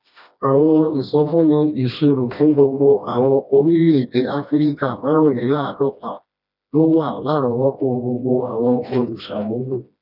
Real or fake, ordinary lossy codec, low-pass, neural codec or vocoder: fake; none; 5.4 kHz; codec, 16 kHz, 1 kbps, FreqCodec, smaller model